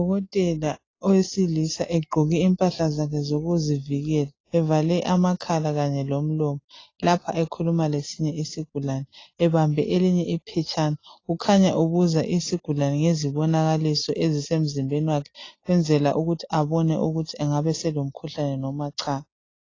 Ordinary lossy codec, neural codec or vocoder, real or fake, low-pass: AAC, 32 kbps; none; real; 7.2 kHz